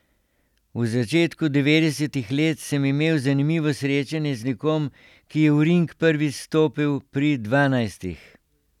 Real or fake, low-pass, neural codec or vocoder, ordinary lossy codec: real; 19.8 kHz; none; none